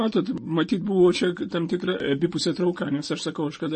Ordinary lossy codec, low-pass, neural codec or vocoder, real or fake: MP3, 32 kbps; 10.8 kHz; none; real